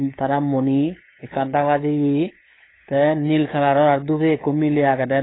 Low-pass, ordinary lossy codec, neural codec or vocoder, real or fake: 7.2 kHz; AAC, 16 kbps; codec, 16 kHz, 8 kbps, FunCodec, trained on LibriTTS, 25 frames a second; fake